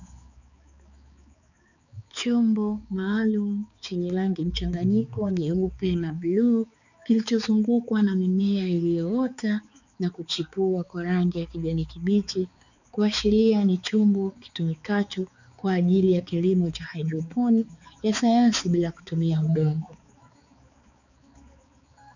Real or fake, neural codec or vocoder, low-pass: fake; codec, 16 kHz, 4 kbps, X-Codec, HuBERT features, trained on balanced general audio; 7.2 kHz